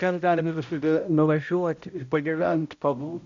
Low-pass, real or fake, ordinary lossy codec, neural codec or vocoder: 7.2 kHz; fake; MP3, 64 kbps; codec, 16 kHz, 0.5 kbps, X-Codec, HuBERT features, trained on balanced general audio